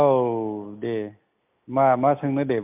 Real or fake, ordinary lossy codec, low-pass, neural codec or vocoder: real; MP3, 32 kbps; 3.6 kHz; none